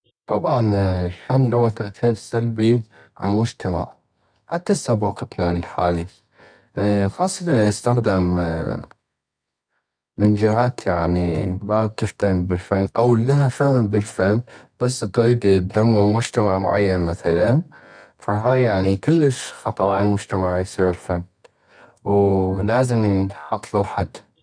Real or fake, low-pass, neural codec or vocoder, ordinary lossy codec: fake; 9.9 kHz; codec, 24 kHz, 0.9 kbps, WavTokenizer, medium music audio release; none